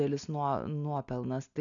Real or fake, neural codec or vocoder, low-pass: real; none; 7.2 kHz